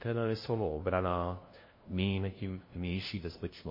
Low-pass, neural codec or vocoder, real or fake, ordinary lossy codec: 5.4 kHz; codec, 16 kHz, 0.5 kbps, FunCodec, trained on LibriTTS, 25 frames a second; fake; MP3, 24 kbps